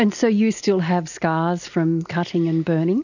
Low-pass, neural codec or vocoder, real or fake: 7.2 kHz; none; real